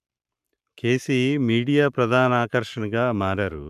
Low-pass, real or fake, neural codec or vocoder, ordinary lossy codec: 14.4 kHz; fake; codec, 44.1 kHz, 7.8 kbps, Pupu-Codec; none